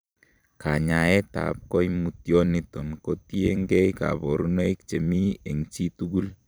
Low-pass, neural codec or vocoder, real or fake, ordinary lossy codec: none; vocoder, 44.1 kHz, 128 mel bands every 256 samples, BigVGAN v2; fake; none